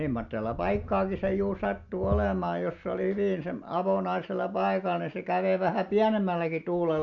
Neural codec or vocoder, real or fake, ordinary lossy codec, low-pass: none; real; MP3, 64 kbps; 7.2 kHz